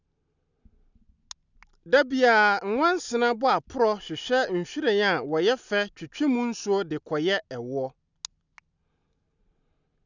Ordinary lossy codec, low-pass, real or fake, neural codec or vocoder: none; 7.2 kHz; real; none